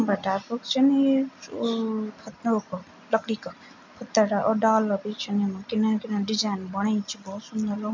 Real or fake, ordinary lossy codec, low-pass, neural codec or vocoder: real; MP3, 64 kbps; 7.2 kHz; none